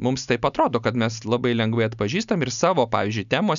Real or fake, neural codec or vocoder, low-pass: real; none; 7.2 kHz